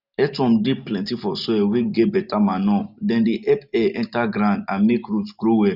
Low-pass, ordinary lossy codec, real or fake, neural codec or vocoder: 5.4 kHz; none; real; none